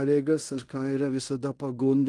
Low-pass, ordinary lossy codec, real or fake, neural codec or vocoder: 10.8 kHz; Opus, 24 kbps; fake; codec, 16 kHz in and 24 kHz out, 0.9 kbps, LongCat-Audio-Codec, fine tuned four codebook decoder